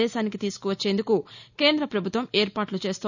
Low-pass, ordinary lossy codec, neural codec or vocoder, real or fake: 7.2 kHz; none; none; real